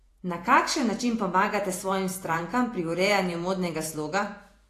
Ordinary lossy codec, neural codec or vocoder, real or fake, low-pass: AAC, 48 kbps; vocoder, 48 kHz, 128 mel bands, Vocos; fake; 14.4 kHz